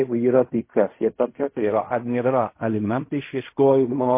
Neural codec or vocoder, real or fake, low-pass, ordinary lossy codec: codec, 16 kHz in and 24 kHz out, 0.4 kbps, LongCat-Audio-Codec, fine tuned four codebook decoder; fake; 3.6 kHz; MP3, 24 kbps